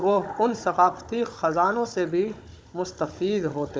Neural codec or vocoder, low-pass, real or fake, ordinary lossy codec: codec, 16 kHz, 16 kbps, FunCodec, trained on Chinese and English, 50 frames a second; none; fake; none